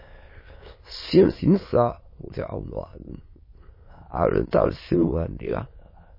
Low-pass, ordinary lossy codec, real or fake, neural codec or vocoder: 5.4 kHz; MP3, 24 kbps; fake; autoencoder, 22.05 kHz, a latent of 192 numbers a frame, VITS, trained on many speakers